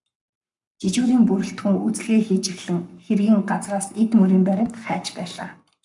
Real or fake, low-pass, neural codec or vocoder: fake; 10.8 kHz; codec, 44.1 kHz, 7.8 kbps, Pupu-Codec